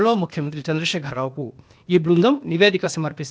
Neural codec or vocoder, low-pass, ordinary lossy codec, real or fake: codec, 16 kHz, 0.8 kbps, ZipCodec; none; none; fake